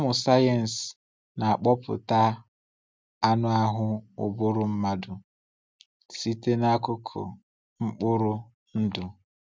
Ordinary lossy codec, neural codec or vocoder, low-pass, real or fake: none; none; none; real